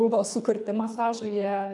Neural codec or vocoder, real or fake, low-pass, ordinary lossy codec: codec, 24 kHz, 3 kbps, HILCodec; fake; 10.8 kHz; MP3, 96 kbps